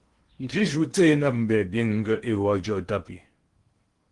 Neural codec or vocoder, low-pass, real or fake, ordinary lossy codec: codec, 16 kHz in and 24 kHz out, 0.8 kbps, FocalCodec, streaming, 65536 codes; 10.8 kHz; fake; Opus, 24 kbps